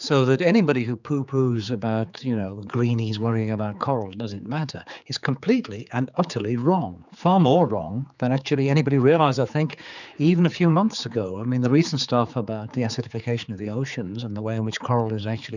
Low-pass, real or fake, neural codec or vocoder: 7.2 kHz; fake; codec, 16 kHz, 4 kbps, X-Codec, HuBERT features, trained on general audio